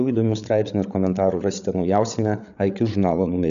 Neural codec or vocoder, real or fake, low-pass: codec, 16 kHz, 8 kbps, FreqCodec, larger model; fake; 7.2 kHz